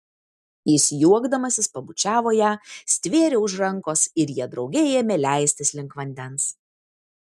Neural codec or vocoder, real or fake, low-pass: none; real; 14.4 kHz